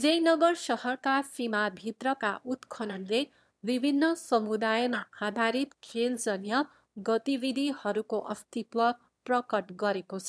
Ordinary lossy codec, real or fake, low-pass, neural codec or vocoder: none; fake; none; autoencoder, 22.05 kHz, a latent of 192 numbers a frame, VITS, trained on one speaker